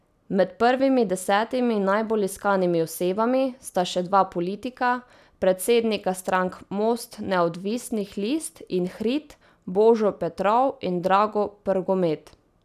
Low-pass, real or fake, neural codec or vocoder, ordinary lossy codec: 14.4 kHz; real; none; none